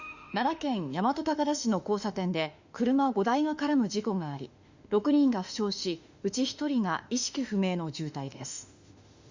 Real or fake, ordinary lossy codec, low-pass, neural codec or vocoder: fake; Opus, 64 kbps; 7.2 kHz; autoencoder, 48 kHz, 32 numbers a frame, DAC-VAE, trained on Japanese speech